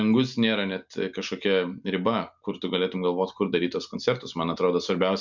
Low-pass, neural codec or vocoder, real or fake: 7.2 kHz; none; real